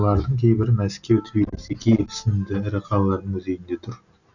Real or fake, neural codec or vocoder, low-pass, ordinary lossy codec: real; none; 7.2 kHz; none